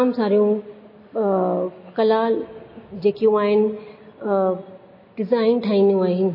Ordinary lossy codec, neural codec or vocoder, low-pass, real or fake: MP3, 24 kbps; none; 5.4 kHz; real